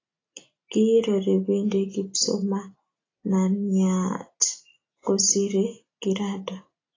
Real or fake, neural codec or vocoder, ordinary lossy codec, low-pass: real; none; AAC, 32 kbps; 7.2 kHz